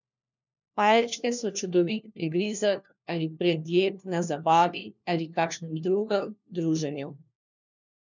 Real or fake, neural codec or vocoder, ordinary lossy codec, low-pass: fake; codec, 16 kHz, 1 kbps, FunCodec, trained on LibriTTS, 50 frames a second; none; 7.2 kHz